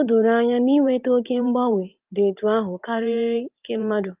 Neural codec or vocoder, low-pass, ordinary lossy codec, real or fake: vocoder, 44.1 kHz, 128 mel bands every 512 samples, BigVGAN v2; 3.6 kHz; Opus, 24 kbps; fake